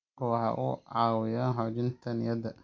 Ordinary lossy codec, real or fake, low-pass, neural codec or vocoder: MP3, 48 kbps; real; 7.2 kHz; none